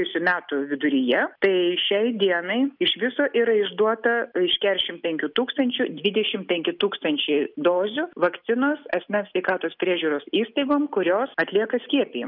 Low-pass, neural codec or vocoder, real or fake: 5.4 kHz; none; real